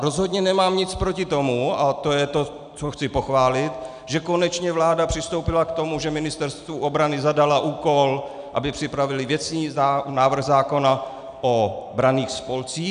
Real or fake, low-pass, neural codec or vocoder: real; 9.9 kHz; none